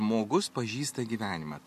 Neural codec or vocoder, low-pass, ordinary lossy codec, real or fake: none; 14.4 kHz; MP3, 64 kbps; real